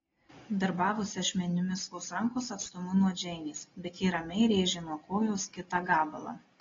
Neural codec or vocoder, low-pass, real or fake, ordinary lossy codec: none; 7.2 kHz; real; AAC, 24 kbps